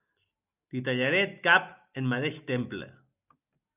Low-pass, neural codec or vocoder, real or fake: 3.6 kHz; none; real